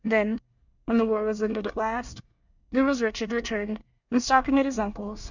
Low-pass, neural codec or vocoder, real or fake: 7.2 kHz; codec, 24 kHz, 1 kbps, SNAC; fake